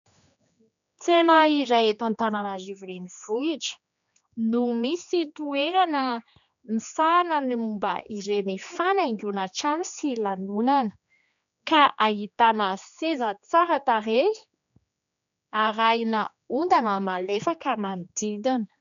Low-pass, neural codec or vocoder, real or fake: 7.2 kHz; codec, 16 kHz, 2 kbps, X-Codec, HuBERT features, trained on general audio; fake